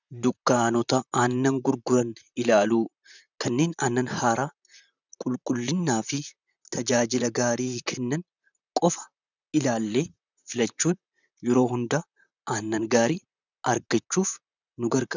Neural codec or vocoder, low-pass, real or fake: vocoder, 44.1 kHz, 128 mel bands, Pupu-Vocoder; 7.2 kHz; fake